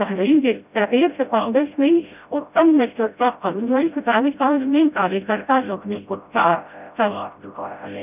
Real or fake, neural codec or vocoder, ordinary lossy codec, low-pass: fake; codec, 16 kHz, 0.5 kbps, FreqCodec, smaller model; none; 3.6 kHz